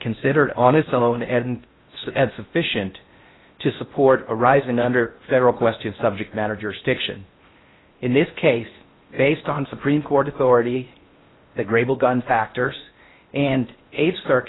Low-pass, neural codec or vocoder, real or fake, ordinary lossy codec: 7.2 kHz; codec, 16 kHz in and 24 kHz out, 0.6 kbps, FocalCodec, streaming, 4096 codes; fake; AAC, 16 kbps